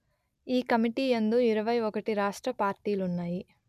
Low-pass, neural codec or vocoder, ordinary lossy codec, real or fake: 14.4 kHz; none; none; real